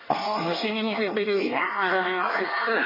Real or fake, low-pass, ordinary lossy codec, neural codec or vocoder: fake; 5.4 kHz; MP3, 24 kbps; codec, 24 kHz, 1 kbps, SNAC